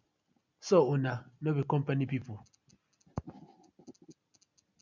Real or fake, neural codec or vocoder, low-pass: real; none; 7.2 kHz